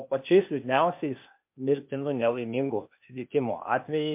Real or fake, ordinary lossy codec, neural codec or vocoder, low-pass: fake; MP3, 32 kbps; codec, 16 kHz, 0.8 kbps, ZipCodec; 3.6 kHz